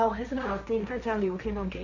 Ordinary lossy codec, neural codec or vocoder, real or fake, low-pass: none; codec, 16 kHz, 1.1 kbps, Voila-Tokenizer; fake; 7.2 kHz